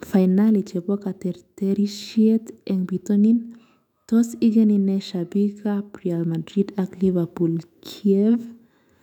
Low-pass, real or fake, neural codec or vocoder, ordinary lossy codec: 19.8 kHz; fake; autoencoder, 48 kHz, 128 numbers a frame, DAC-VAE, trained on Japanese speech; none